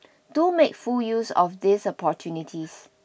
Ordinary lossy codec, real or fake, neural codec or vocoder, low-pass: none; real; none; none